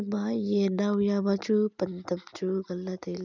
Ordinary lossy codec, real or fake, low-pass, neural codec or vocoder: none; real; 7.2 kHz; none